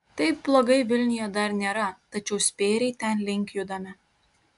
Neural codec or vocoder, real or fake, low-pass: none; real; 10.8 kHz